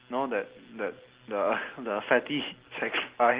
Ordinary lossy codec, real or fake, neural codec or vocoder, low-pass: Opus, 16 kbps; real; none; 3.6 kHz